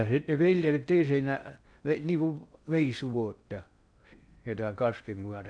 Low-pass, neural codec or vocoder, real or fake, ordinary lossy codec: 9.9 kHz; codec, 16 kHz in and 24 kHz out, 0.6 kbps, FocalCodec, streaming, 2048 codes; fake; none